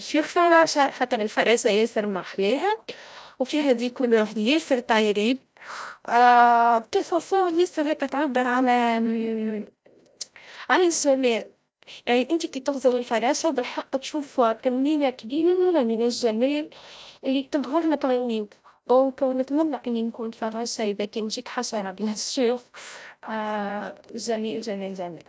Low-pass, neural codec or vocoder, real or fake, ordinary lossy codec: none; codec, 16 kHz, 0.5 kbps, FreqCodec, larger model; fake; none